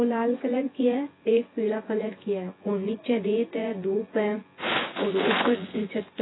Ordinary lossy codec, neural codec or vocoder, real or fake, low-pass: AAC, 16 kbps; vocoder, 24 kHz, 100 mel bands, Vocos; fake; 7.2 kHz